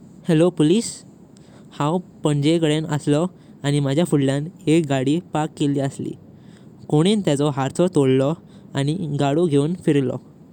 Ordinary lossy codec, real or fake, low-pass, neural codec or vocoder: none; real; 19.8 kHz; none